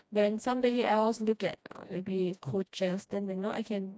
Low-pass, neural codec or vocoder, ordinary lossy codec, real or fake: none; codec, 16 kHz, 1 kbps, FreqCodec, smaller model; none; fake